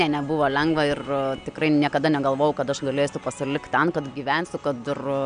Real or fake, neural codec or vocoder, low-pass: real; none; 9.9 kHz